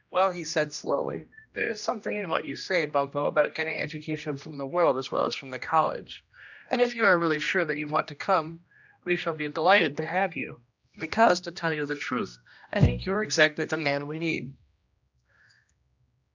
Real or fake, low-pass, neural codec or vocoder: fake; 7.2 kHz; codec, 16 kHz, 1 kbps, X-Codec, HuBERT features, trained on general audio